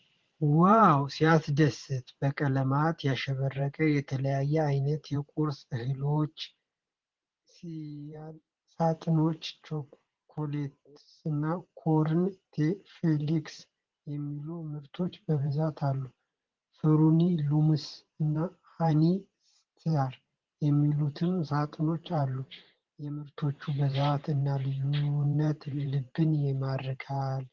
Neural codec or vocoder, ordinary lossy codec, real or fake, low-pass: vocoder, 44.1 kHz, 128 mel bands every 512 samples, BigVGAN v2; Opus, 16 kbps; fake; 7.2 kHz